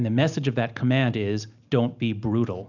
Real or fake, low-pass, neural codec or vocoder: real; 7.2 kHz; none